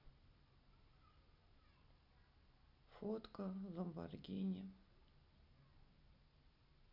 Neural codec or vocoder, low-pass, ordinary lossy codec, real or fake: none; 5.4 kHz; none; real